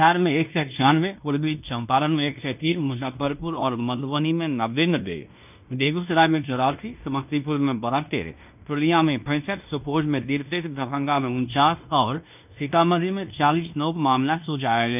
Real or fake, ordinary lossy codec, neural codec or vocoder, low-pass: fake; none; codec, 16 kHz in and 24 kHz out, 0.9 kbps, LongCat-Audio-Codec, fine tuned four codebook decoder; 3.6 kHz